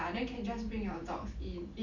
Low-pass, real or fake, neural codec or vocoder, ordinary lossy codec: 7.2 kHz; real; none; none